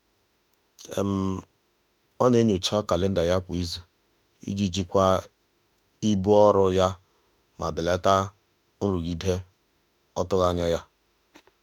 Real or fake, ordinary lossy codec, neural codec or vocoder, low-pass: fake; none; autoencoder, 48 kHz, 32 numbers a frame, DAC-VAE, trained on Japanese speech; 19.8 kHz